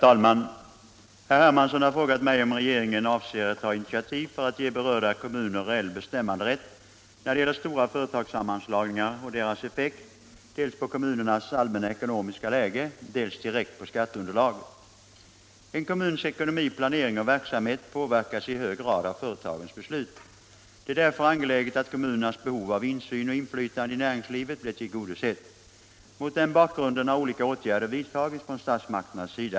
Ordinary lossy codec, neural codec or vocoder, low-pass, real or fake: none; none; none; real